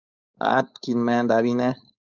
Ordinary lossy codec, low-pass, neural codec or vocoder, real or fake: Opus, 64 kbps; 7.2 kHz; codec, 16 kHz, 4.8 kbps, FACodec; fake